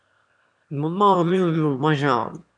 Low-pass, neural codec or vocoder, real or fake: 9.9 kHz; autoencoder, 22.05 kHz, a latent of 192 numbers a frame, VITS, trained on one speaker; fake